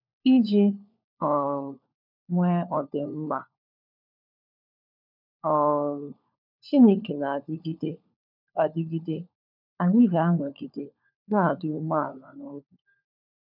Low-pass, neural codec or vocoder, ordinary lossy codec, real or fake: 5.4 kHz; codec, 16 kHz, 4 kbps, FunCodec, trained on LibriTTS, 50 frames a second; none; fake